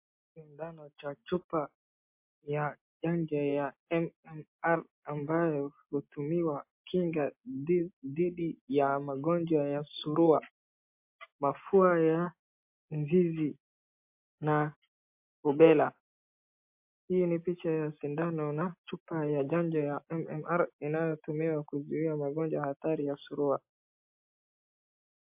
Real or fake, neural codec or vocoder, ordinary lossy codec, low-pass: real; none; MP3, 32 kbps; 3.6 kHz